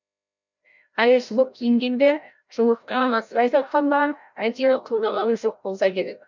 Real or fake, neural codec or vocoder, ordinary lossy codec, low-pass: fake; codec, 16 kHz, 0.5 kbps, FreqCodec, larger model; none; 7.2 kHz